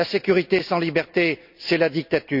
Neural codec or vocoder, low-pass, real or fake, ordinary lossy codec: none; 5.4 kHz; real; none